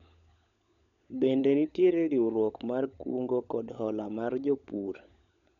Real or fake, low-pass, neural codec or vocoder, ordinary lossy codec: fake; 7.2 kHz; codec, 16 kHz, 16 kbps, FunCodec, trained on LibriTTS, 50 frames a second; none